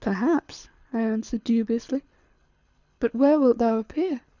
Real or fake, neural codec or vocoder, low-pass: fake; codec, 24 kHz, 6 kbps, HILCodec; 7.2 kHz